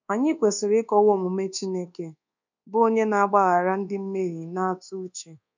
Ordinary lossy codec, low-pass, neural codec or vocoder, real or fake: none; 7.2 kHz; codec, 24 kHz, 1.2 kbps, DualCodec; fake